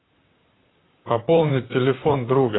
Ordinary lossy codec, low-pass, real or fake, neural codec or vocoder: AAC, 16 kbps; 7.2 kHz; fake; vocoder, 44.1 kHz, 128 mel bands, Pupu-Vocoder